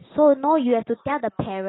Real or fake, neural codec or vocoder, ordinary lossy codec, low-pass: real; none; AAC, 16 kbps; 7.2 kHz